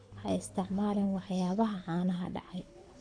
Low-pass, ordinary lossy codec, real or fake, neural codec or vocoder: 9.9 kHz; none; fake; codec, 24 kHz, 6 kbps, HILCodec